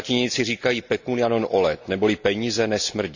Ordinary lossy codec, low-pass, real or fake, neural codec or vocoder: none; 7.2 kHz; real; none